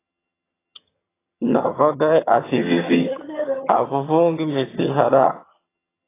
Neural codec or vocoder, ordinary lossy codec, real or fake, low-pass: vocoder, 22.05 kHz, 80 mel bands, HiFi-GAN; AAC, 16 kbps; fake; 3.6 kHz